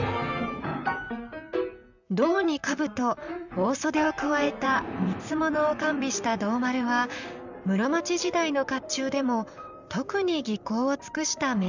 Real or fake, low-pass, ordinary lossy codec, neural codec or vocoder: fake; 7.2 kHz; none; vocoder, 44.1 kHz, 128 mel bands, Pupu-Vocoder